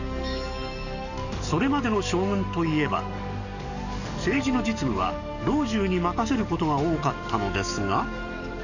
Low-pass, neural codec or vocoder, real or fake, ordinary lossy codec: 7.2 kHz; codec, 44.1 kHz, 7.8 kbps, DAC; fake; none